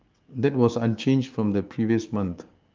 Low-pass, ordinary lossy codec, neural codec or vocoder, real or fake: 7.2 kHz; Opus, 32 kbps; codec, 16 kHz, 6 kbps, DAC; fake